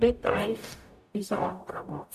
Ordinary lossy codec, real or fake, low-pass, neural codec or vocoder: none; fake; 14.4 kHz; codec, 44.1 kHz, 0.9 kbps, DAC